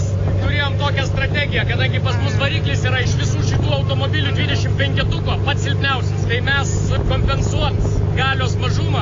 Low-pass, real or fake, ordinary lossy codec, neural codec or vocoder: 7.2 kHz; real; AAC, 32 kbps; none